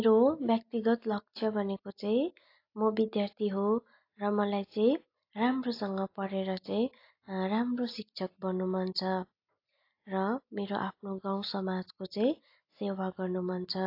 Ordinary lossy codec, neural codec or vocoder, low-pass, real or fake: AAC, 32 kbps; none; 5.4 kHz; real